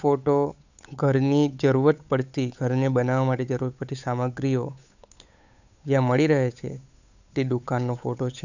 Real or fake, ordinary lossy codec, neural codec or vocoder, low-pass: fake; none; codec, 16 kHz, 8 kbps, FunCodec, trained on Chinese and English, 25 frames a second; 7.2 kHz